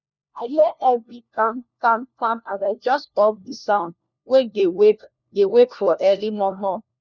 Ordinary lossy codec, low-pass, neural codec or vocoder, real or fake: none; 7.2 kHz; codec, 16 kHz, 1 kbps, FunCodec, trained on LibriTTS, 50 frames a second; fake